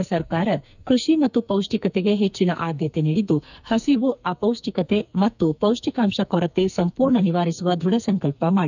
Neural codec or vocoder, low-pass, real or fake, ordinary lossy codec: codec, 44.1 kHz, 2.6 kbps, SNAC; 7.2 kHz; fake; none